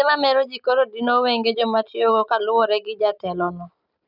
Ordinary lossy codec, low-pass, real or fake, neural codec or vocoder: none; 5.4 kHz; real; none